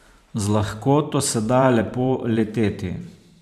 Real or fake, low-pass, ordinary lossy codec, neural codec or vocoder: fake; 14.4 kHz; none; vocoder, 44.1 kHz, 128 mel bands every 512 samples, BigVGAN v2